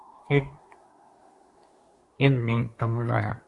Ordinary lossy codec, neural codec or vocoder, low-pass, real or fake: AAC, 48 kbps; codec, 24 kHz, 1 kbps, SNAC; 10.8 kHz; fake